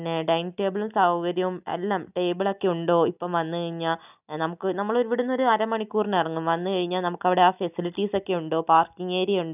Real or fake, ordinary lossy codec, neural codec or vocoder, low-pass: real; none; none; 3.6 kHz